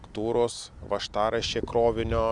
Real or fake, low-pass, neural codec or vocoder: real; 10.8 kHz; none